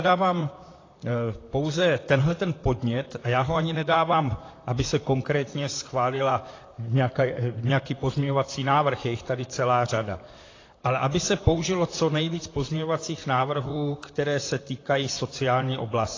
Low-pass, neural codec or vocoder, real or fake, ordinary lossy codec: 7.2 kHz; vocoder, 44.1 kHz, 128 mel bands, Pupu-Vocoder; fake; AAC, 32 kbps